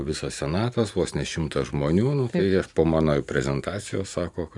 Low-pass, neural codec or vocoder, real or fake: 10.8 kHz; vocoder, 44.1 kHz, 128 mel bands every 512 samples, BigVGAN v2; fake